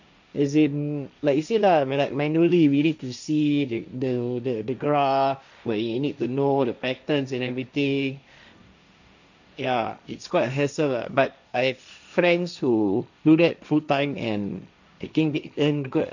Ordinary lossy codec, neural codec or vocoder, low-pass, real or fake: none; codec, 16 kHz, 1.1 kbps, Voila-Tokenizer; 7.2 kHz; fake